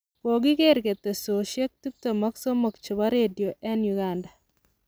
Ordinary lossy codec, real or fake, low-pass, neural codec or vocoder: none; real; none; none